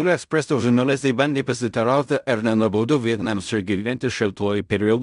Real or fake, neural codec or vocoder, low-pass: fake; codec, 16 kHz in and 24 kHz out, 0.4 kbps, LongCat-Audio-Codec, fine tuned four codebook decoder; 10.8 kHz